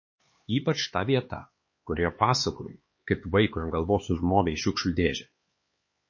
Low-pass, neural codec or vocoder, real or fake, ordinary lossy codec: 7.2 kHz; codec, 16 kHz, 4 kbps, X-Codec, HuBERT features, trained on LibriSpeech; fake; MP3, 32 kbps